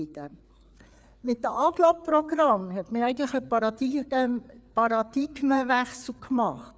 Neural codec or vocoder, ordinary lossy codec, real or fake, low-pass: codec, 16 kHz, 4 kbps, FreqCodec, larger model; none; fake; none